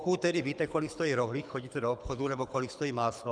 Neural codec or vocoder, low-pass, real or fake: codec, 24 kHz, 6 kbps, HILCodec; 9.9 kHz; fake